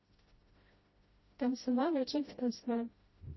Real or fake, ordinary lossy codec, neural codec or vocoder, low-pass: fake; MP3, 24 kbps; codec, 16 kHz, 0.5 kbps, FreqCodec, smaller model; 7.2 kHz